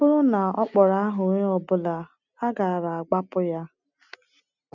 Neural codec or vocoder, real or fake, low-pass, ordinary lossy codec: none; real; 7.2 kHz; none